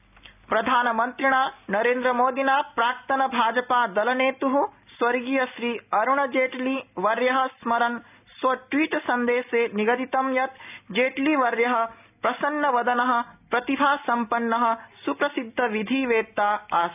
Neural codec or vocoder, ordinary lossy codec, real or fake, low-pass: none; none; real; 3.6 kHz